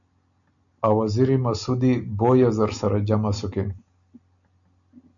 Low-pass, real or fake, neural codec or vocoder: 7.2 kHz; real; none